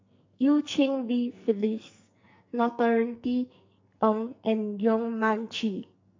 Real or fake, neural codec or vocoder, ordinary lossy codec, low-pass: fake; codec, 44.1 kHz, 2.6 kbps, SNAC; MP3, 64 kbps; 7.2 kHz